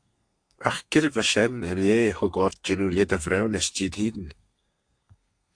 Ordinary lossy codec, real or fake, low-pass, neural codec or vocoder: AAC, 64 kbps; fake; 9.9 kHz; codec, 32 kHz, 1.9 kbps, SNAC